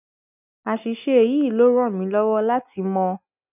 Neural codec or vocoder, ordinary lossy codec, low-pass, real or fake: none; none; 3.6 kHz; real